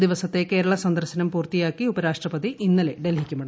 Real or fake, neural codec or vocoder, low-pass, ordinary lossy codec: real; none; none; none